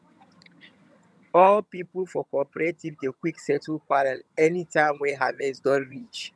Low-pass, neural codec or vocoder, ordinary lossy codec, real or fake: none; vocoder, 22.05 kHz, 80 mel bands, HiFi-GAN; none; fake